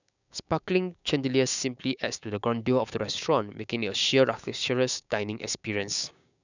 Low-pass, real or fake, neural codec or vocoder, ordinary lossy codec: 7.2 kHz; fake; codec, 16 kHz, 6 kbps, DAC; none